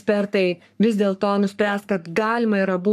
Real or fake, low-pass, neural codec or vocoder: fake; 14.4 kHz; codec, 44.1 kHz, 3.4 kbps, Pupu-Codec